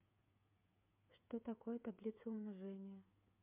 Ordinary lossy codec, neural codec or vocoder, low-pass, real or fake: MP3, 32 kbps; codec, 16 kHz, 8 kbps, FreqCodec, smaller model; 3.6 kHz; fake